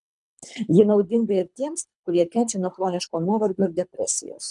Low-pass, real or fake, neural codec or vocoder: 10.8 kHz; fake; codec, 24 kHz, 3 kbps, HILCodec